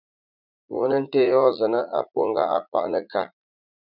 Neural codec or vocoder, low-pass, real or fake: vocoder, 44.1 kHz, 80 mel bands, Vocos; 5.4 kHz; fake